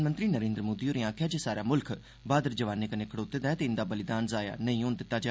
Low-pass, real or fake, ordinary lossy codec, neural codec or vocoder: none; real; none; none